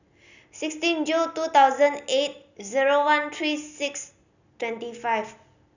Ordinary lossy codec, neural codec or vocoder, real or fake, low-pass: none; none; real; 7.2 kHz